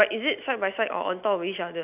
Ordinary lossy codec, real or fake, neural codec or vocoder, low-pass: none; real; none; 3.6 kHz